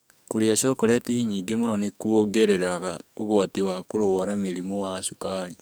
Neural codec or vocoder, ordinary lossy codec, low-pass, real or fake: codec, 44.1 kHz, 2.6 kbps, SNAC; none; none; fake